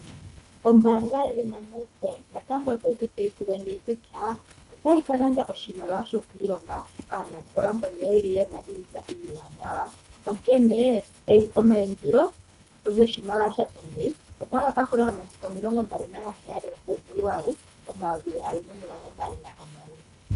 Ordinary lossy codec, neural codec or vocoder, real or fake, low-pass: AAC, 96 kbps; codec, 24 kHz, 1.5 kbps, HILCodec; fake; 10.8 kHz